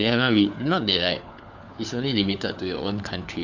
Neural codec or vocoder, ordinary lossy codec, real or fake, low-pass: codec, 16 kHz, 4 kbps, FunCodec, trained on LibriTTS, 50 frames a second; none; fake; 7.2 kHz